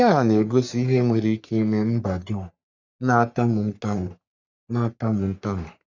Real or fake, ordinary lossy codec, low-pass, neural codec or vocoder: fake; none; 7.2 kHz; codec, 44.1 kHz, 3.4 kbps, Pupu-Codec